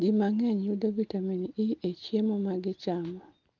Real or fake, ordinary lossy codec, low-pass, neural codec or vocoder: real; Opus, 32 kbps; 7.2 kHz; none